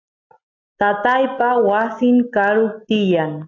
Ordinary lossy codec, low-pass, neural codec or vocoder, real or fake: AAC, 48 kbps; 7.2 kHz; none; real